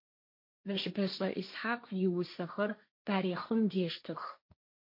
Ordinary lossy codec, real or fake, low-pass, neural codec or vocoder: MP3, 32 kbps; fake; 5.4 kHz; codec, 16 kHz, 1.1 kbps, Voila-Tokenizer